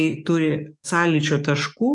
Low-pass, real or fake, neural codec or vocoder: 10.8 kHz; fake; codec, 44.1 kHz, 7.8 kbps, DAC